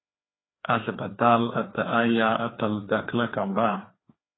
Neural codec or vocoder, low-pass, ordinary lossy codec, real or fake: codec, 16 kHz, 2 kbps, FreqCodec, larger model; 7.2 kHz; AAC, 16 kbps; fake